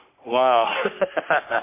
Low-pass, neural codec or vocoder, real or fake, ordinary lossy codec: 3.6 kHz; codec, 44.1 kHz, 3.4 kbps, Pupu-Codec; fake; MP3, 24 kbps